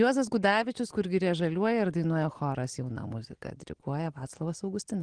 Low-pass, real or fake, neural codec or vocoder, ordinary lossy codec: 9.9 kHz; real; none; Opus, 16 kbps